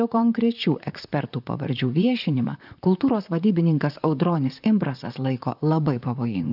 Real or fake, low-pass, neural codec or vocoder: fake; 5.4 kHz; vocoder, 44.1 kHz, 128 mel bands, Pupu-Vocoder